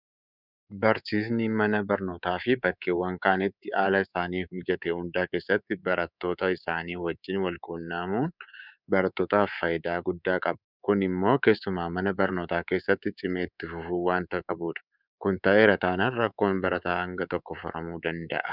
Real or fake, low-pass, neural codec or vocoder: fake; 5.4 kHz; codec, 24 kHz, 3.1 kbps, DualCodec